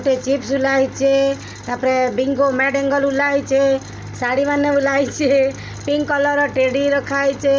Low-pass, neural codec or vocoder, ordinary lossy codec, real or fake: none; none; none; real